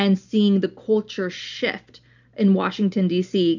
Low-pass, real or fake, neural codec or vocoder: 7.2 kHz; real; none